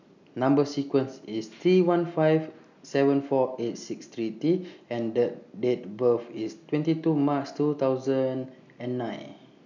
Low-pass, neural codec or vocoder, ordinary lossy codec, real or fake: 7.2 kHz; none; none; real